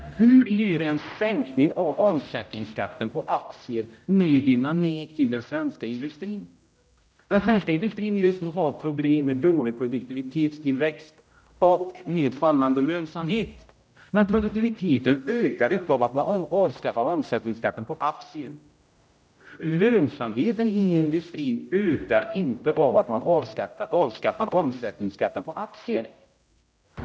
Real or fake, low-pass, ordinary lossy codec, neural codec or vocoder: fake; none; none; codec, 16 kHz, 0.5 kbps, X-Codec, HuBERT features, trained on general audio